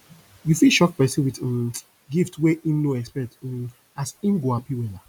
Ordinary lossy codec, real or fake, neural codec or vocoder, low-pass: none; real; none; 19.8 kHz